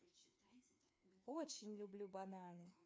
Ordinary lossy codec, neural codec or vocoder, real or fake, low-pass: none; codec, 16 kHz, 2 kbps, FreqCodec, larger model; fake; none